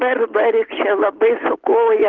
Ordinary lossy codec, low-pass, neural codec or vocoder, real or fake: Opus, 16 kbps; 7.2 kHz; none; real